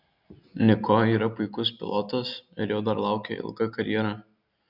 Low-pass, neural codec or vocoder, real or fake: 5.4 kHz; none; real